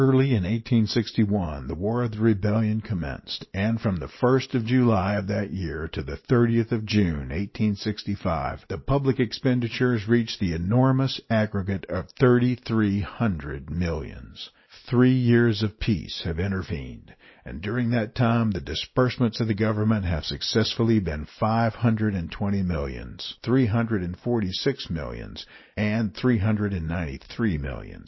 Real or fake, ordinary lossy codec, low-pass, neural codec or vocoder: real; MP3, 24 kbps; 7.2 kHz; none